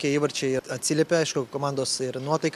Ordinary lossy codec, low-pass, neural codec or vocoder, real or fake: AAC, 96 kbps; 14.4 kHz; none; real